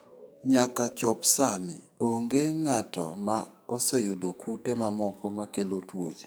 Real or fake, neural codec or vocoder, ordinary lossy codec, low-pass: fake; codec, 44.1 kHz, 2.6 kbps, SNAC; none; none